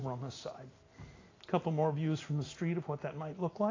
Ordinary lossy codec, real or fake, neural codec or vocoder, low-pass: AAC, 32 kbps; real; none; 7.2 kHz